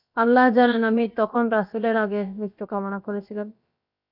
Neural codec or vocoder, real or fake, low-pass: codec, 16 kHz, about 1 kbps, DyCAST, with the encoder's durations; fake; 5.4 kHz